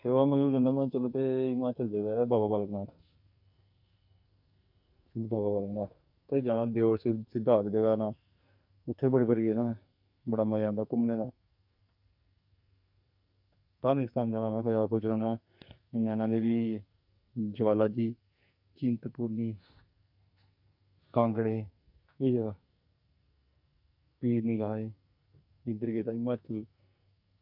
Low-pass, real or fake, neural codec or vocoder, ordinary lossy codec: 5.4 kHz; fake; codec, 44.1 kHz, 2.6 kbps, SNAC; none